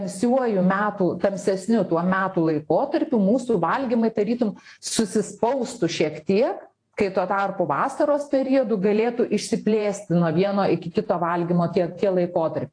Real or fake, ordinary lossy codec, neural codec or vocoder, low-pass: fake; AAC, 48 kbps; vocoder, 48 kHz, 128 mel bands, Vocos; 9.9 kHz